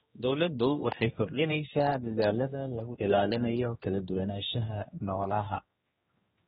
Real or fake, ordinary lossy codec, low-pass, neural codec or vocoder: fake; AAC, 16 kbps; 7.2 kHz; codec, 16 kHz, 1 kbps, X-Codec, HuBERT features, trained on general audio